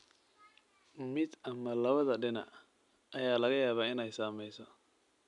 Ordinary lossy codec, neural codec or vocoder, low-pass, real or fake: none; none; 10.8 kHz; real